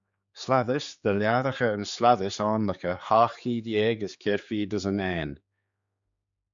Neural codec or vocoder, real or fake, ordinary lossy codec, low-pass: codec, 16 kHz, 4 kbps, X-Codec, HuBERT features, trained on general audio; fake; MP3, 64 kbps; 7.2 kHz